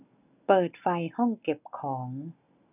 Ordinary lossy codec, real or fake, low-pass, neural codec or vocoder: none; real; 3.6 kHz; none